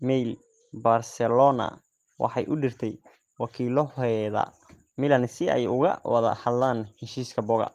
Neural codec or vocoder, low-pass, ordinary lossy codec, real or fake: none; 14.4 kHz; Opus, 24 kbps; real